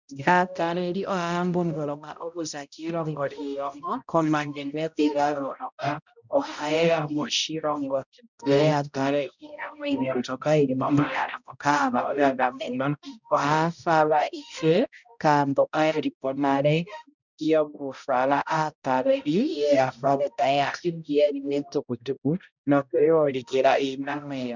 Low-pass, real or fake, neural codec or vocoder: 7.2 kHz; fake; codec, 16 kHz, 0.5 kbps, X-Codec, HuBERT features, trained on balanced general audio